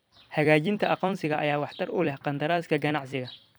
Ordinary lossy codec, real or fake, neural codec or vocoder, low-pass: none; fake; vocoder, 44.1 kHz, 128 mel bands every 256 samples, BigVGAN v2; none